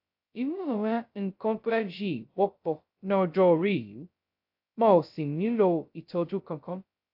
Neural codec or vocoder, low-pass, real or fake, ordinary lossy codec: codec, 16 kHz, 0.2 kbps, FocalCodec; 5.4 kHz; fake; AAC, 48 kbps